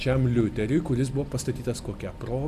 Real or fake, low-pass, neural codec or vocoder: real; 14.4 kHz; none